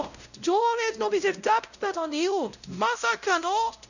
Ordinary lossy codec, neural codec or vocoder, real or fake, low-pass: none; codec, 16 kHz, 0.5 kbps, X-Codec, WavLM features, trained on Multilingual LibriSpeech; fake; 7.2 kHz